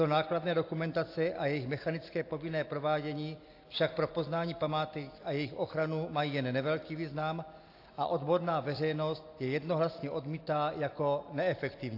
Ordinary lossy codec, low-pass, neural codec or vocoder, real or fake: AAC, 32 kbps; 5.4 kHz; none; real